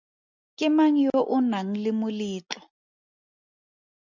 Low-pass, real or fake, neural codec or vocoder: 7.2 kHz; real; none